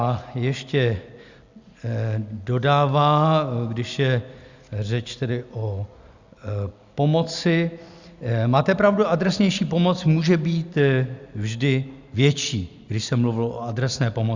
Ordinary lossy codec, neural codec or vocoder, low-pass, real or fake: Opus, 64 kbps; none; 7.2 kHz; real